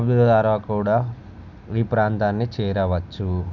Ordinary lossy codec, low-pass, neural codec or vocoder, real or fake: none; 7.2 kHz; none; real